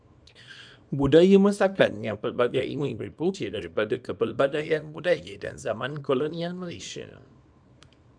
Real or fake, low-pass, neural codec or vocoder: fake; 9.9 kHz; codec, 24 kHz, 0.9 kbps, WavTokenizer, small release